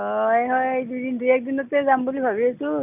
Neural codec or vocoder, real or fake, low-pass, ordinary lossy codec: none; real; 3.6 kHz; none